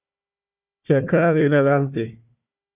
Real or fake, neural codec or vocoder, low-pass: fake; codec, 16 kHz, 1 kbps, FunCodec, trained on Chinese and English, 50 frames a second; 3.6 kHz